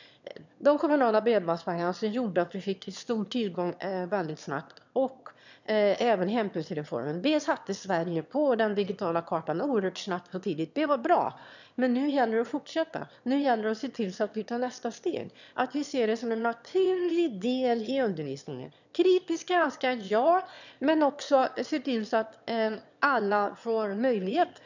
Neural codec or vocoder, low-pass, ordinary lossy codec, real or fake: autoencoder, 22.05 kHz, a latent of 192 numbers a frame, VITS, trained on one speaker; 7.2 kHz; none; fake